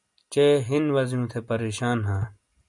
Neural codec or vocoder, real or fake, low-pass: none; real; 10.8 kHz